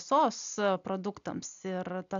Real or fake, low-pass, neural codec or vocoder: real; 7.2 kHz; none